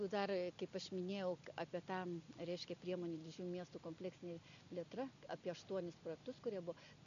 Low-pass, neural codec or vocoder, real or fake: 7.2 kHz; none; real